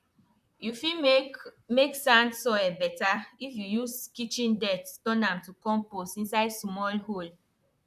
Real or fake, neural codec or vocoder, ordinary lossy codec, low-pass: fake; vocoder, 44.1 kHz, 128 mel bands, Pupu-Vocoder; none; 14.4 kHz